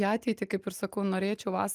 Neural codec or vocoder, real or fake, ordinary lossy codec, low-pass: none; real; Opus, 32 kbps; 14.4 kHz